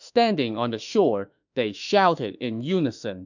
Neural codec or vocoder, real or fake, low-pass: autoencoder, 48 kHz, 32 numbers a frame, DAC-VAE, trained on Japanese speech; fake; 7.2 kHz